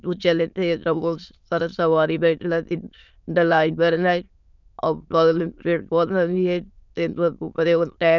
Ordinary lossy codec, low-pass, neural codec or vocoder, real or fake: none; 7.2 kHz; autoencoder, 22.05 kHz, a latent of 192 numbers a frame, VITS, trained on many speakers; fake